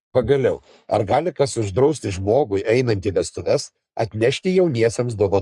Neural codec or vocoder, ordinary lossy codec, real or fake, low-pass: codec, 44.1 kHz, 3.4 kbps, Pupu-Codec; MP3, 96 kbps; fake; 10.8 kHz